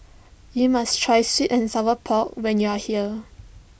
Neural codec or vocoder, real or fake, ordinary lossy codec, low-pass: none; real; none; none